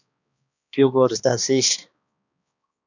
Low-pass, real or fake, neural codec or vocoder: 7.2 kHz; fake; codec, 16 kHz, 2 kbps, X-Codec, HuBERT features, trained on balanced general audio